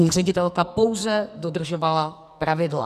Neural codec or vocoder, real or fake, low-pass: codec, 44.1 kHz, 2.6 kbps, SNAC; fake; 14.4 kHz